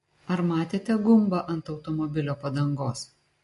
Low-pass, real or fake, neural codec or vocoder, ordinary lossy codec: 14.4 kHz; real; none; MP3, 48 kbps